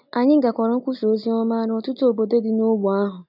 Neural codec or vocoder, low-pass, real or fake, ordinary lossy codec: none; 5.4 kHz; real; none